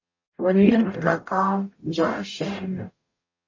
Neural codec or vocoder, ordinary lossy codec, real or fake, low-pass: codec, 44.1 kHz, 0.9 kbps, DAC; MP3, 32 kbps; fake; 7.2 kHz